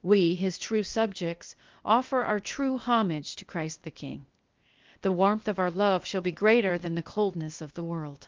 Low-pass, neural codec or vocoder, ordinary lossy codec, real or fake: 7.2 kHz; codec, 16 kHz, 0.8 kbps, ZipCodec; Opus, 24 kbps; fake